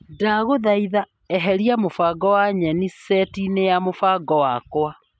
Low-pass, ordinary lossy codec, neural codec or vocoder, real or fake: none; none; none; real